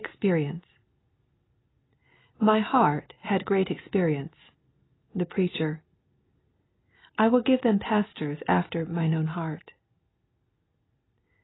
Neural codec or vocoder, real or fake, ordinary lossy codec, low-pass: none; real; AAC, 16 kbps; 7.2 kHz